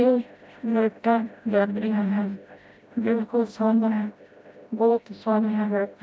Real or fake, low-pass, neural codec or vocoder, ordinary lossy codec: fake; none; codec, 16 kHz, 0.5 kbps, FreqCodec, smaller model; none